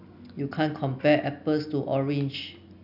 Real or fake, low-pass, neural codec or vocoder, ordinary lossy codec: real; 5.4 kHz; none; none